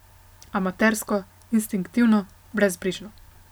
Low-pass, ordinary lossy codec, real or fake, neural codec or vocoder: none; none; real; none